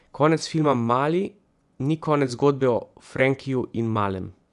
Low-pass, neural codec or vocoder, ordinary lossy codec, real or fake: 10.8 kHz; vocoder, 24 kHz, 100 mel bands, Vocos; none; fake